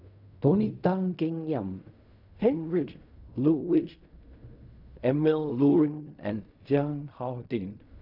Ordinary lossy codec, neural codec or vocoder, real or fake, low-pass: none; codec, 16 kHz in and 24 kHz out, 0.4 kbps, LongCat-Audio-Codec, fine tuned four codebook decoder; fake; 5.4 kHz